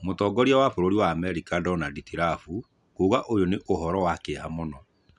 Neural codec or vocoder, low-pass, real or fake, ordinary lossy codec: none; 10.8 kHz; real; none